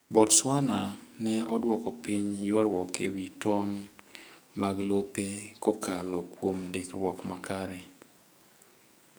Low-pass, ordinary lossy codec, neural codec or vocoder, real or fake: none; none; codec, 44.1 kHz, 2.6 kbps, SNAC; fake